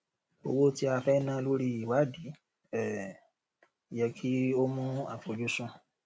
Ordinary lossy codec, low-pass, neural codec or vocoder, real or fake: none; none; none; real